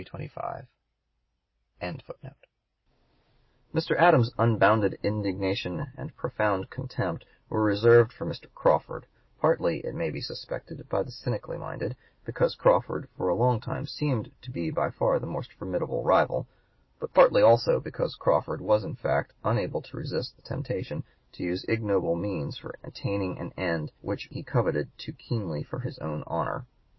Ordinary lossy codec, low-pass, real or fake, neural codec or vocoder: MP3, 24 kbps; 7.2 kHz; real; none